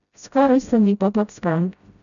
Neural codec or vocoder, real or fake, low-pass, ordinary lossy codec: codec, 16 kHz, 0.5 kbps, FreqCodec, smaller model; fake; 7.2 kHz; none